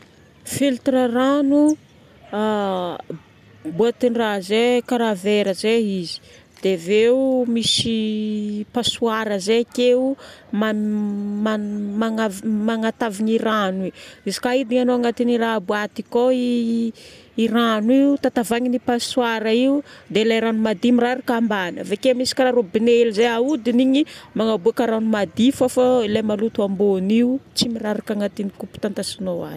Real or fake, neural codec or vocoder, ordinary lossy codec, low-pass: real; none; none; 14.4 kHz